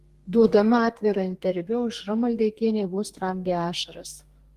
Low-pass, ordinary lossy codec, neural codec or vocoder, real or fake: 14.4 kHz; Opus, 24 kbps; codec, 32 kHz, 1.9 kbps, SNAC; fake